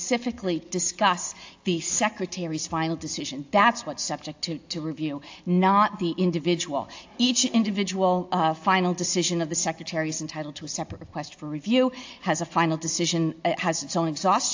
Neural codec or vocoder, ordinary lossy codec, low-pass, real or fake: none; AAC, 48 kbps; 7.2 kHz; real